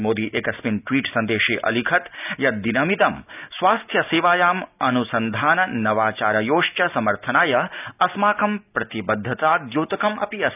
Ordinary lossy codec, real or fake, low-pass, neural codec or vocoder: none; real; 3.6 kHz; none